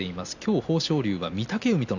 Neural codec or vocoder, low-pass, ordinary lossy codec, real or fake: none; 7.2 kHz; none; real